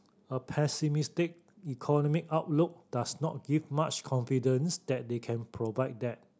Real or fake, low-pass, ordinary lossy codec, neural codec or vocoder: real; none; none; none